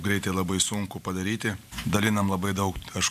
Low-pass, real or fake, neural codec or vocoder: 14.4 kHz; fake; vocoder, 44.1 kHz, 128 mel bands every 512 samples, BigVGAN v2